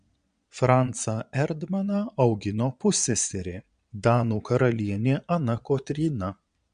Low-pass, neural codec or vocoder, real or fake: 9.9 kHz; vocoder, 22.05 kHz, 80 mel bands, Vocos; fake